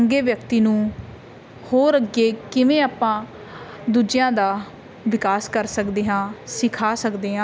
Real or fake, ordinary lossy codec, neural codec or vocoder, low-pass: real; none; none; none